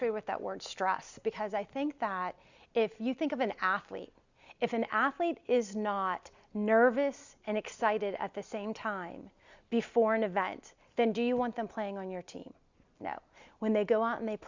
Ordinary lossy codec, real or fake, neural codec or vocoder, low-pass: Opus, 64 kbps; real; none; 7.2 kHz